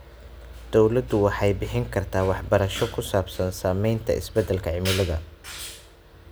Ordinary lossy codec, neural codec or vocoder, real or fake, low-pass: none; none; real; none